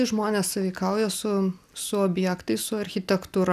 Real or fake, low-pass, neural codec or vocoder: real; 14.4 kHz; none